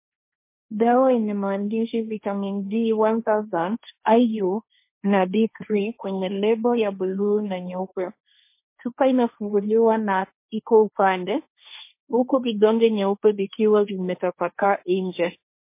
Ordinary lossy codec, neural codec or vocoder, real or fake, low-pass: MP3, 24 kbps; codec, 16 kHz, 1.1 kbps, Voila-Tokenizer; fake; 3.6 kHz